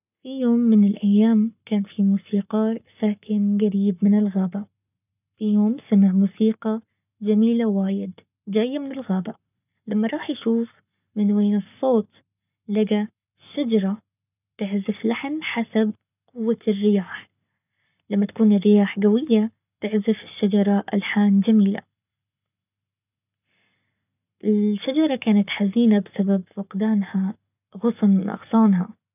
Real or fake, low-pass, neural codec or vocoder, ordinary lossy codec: fake; 3.6 kHz; codec, 44.1 kHz, 7.8 kbps, Pupu-Codec; none